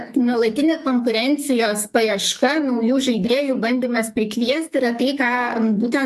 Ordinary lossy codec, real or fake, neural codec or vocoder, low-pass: MP3, 96 kbps; fake; codec, 44.1 kHz, 2.6 kbps, SNAC; 14.4 kHz